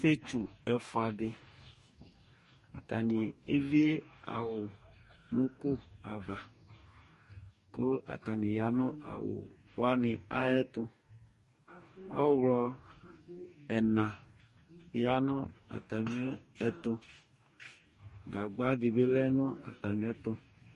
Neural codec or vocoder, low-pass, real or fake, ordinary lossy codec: codec, 44.1 kHz, 2.6 kbps, DAC; 14.4 kHz; fake; MP3, 48 kbps